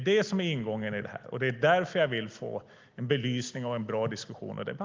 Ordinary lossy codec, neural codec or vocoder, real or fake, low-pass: Opus, 32 kbps; none; real; 7.2 kHz